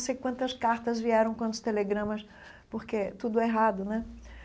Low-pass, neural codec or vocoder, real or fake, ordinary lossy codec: none; none; real; none